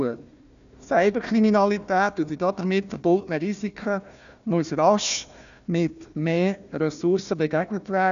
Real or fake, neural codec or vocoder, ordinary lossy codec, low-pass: fake; codec, 16 kHz, 1 kbps, FunCodec, trained on Chinese and English, 50 frames a second; none; 7.2 kHz